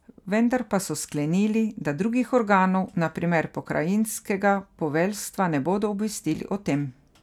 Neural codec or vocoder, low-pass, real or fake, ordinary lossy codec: none; 19.8 kHz; real; none